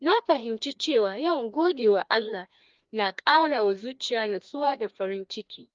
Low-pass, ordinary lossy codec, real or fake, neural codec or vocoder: 7.2 kHz; Opus, 32 kbps; fake; codec, 16 kHz, 1 kbps, FreqCodec, larger model